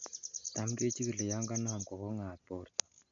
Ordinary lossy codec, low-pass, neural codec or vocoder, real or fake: none; 7.2 kHz; none; real